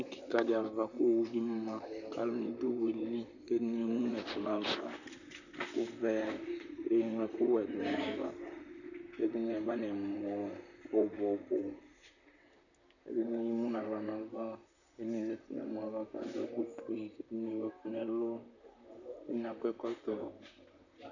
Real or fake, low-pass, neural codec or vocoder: fake; 7.2 kHz; vocoder, 44.1 kHz, 128 mel bands, Pupu-Vocoder